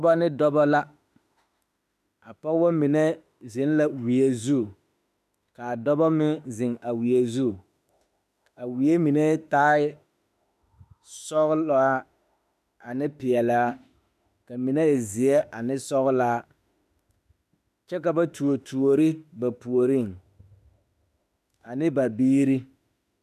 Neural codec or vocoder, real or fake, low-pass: autoencoder, 48 kHz, 32 numbers a frame, DAC-VAE, trained on Japanese speech; fake; 14.4 kHz